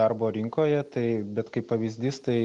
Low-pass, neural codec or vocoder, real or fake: 10.8 kHz; none; real